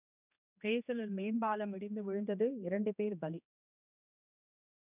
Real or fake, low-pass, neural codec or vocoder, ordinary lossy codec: fake; 3.6 kHz; codec, 16 kHz, 2 kbps, X-Codec, HuBERT features, trained on general audio; none